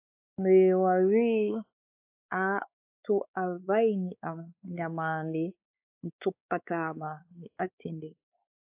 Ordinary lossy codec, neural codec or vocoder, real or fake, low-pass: AAC, 32 kbps; codec, 16 kHz, 4 kbps, X-Codec, WavLM features, trained on Multilingual LibriSpeech; fake; 3.6 kHz